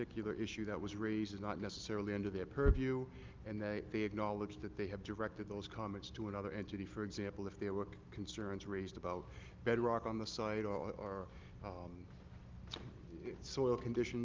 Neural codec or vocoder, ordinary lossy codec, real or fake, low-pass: none; Opus, 32 kbps; real; 7.2 kHz